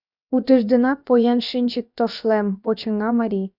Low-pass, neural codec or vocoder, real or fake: 5.4 kHz; codec, 16 kHz, about 1 kbps, DyCAST, with the encoder's durations; fake